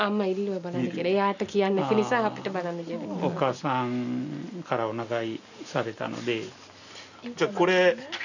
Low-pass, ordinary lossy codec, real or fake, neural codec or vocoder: 7.2 kHz; none; real; none